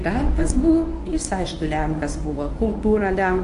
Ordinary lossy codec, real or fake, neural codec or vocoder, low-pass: AAC, 64 kbps; fake; codec, 24 kHz, 0.9 kbps, WavTokenizer, medium speech release version 1; 10.8 kHz